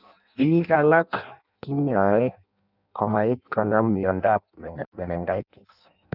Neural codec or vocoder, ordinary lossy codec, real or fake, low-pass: codec, 16 kHz in and 24 kHz out, 0.6 kbps, FireRedTTS-2 codec; none; fake; 5.4 kHz